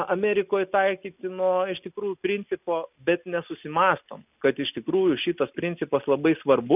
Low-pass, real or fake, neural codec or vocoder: 3.6 kHz; real; none